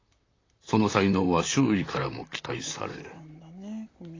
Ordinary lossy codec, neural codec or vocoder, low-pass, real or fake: AAC, 32 kbps; vocoder, 44.1 kHz, 128 mel bands, Pupu-Vocoder; 7.2 kHz; fake